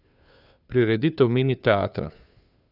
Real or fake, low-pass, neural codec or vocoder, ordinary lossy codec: fake; 5.4 kHz; codec, 16 kHz, 2 kbps, FunCodec, trained on Chinese and English, 25 frames a second; none